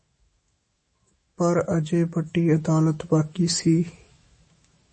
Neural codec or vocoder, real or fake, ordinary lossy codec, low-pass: autoencoder, 48 kHz, 128 numbers a frame, DAC-VAE, trained on Japanese speech; fake; MP3, 32 kbps; 10.8 kHz